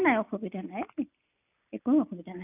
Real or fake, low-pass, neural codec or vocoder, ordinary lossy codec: real; 3.6 kHz; none; none